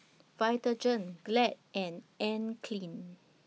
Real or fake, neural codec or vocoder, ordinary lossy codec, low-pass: real; none; none; none